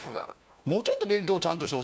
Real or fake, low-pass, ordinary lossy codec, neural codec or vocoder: fake; none; none; codec, 16 kHz, 1 kbps, FunCodec, trained on LibriTTS, 50 frames a second